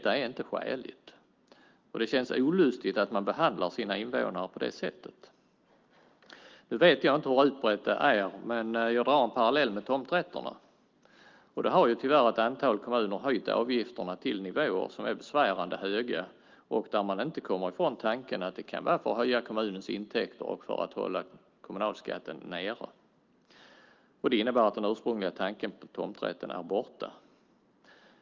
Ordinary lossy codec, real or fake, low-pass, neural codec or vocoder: Opus, 32 kbps; real; 7.2 kHz; none